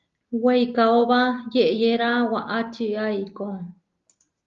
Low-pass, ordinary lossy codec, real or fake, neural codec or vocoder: 7.2 kHz; Opus, 32 kbps; real; none